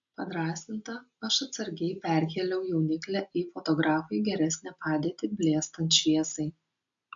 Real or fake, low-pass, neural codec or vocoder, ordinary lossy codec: real; 7.2 kHz; none; AAC, 64 kbps